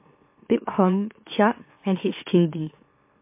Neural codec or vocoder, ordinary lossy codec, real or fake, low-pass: autoencoder, 44.1 kHz, a latent of 192 numbers a frame, MeloTTS; MP3, 24 kbps; fake; 3.6 kHz